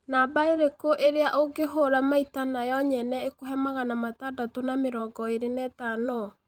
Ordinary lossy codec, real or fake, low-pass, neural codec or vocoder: Opus, 32 kbps; fake; 19.8 kHz; vocoder, 44.1 kHz, 128 mel bands every 512 samples, BigVGAN v2